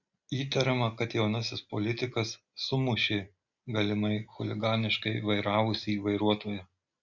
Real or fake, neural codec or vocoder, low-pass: real; none; 7.2 kHz